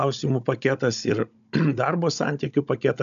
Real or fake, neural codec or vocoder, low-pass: fake; codec, 16 kHz, 16 kbps, FunCodec, trained on Chinese and English, 50 frames a second; 7.2 kHz